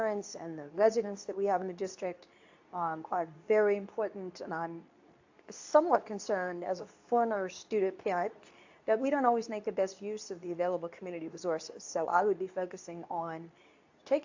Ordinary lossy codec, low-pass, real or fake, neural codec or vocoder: AAC, 48 kbps; 7.2 kHz; fake; codec, 24 kHz, 0.9 kbps, WavTokenizer, medium speech release version 2